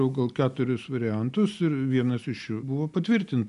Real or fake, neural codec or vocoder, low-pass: real; none; 10.8 kHz